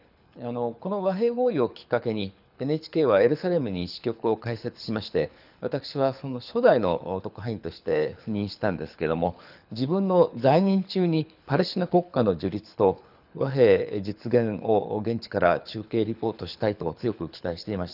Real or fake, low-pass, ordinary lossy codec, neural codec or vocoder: fake; 5.4 kHz; none; codec, 24 kHz, 6 kbps, HILCodec